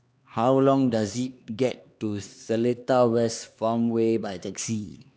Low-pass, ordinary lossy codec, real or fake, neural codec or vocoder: none; none; fake; codec, 16 kHz, 2 kbps, X-Codec, HuBERT features, trained on LibriSpeech